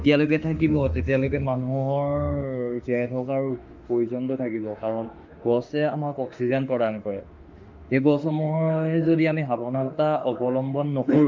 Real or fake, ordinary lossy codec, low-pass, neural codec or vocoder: fake; Opus, 24 kbps; 7.2 kHz; autoencoder, 48 kHz, 32 numbers a frame, DAC-VAE, trained on Japanese speech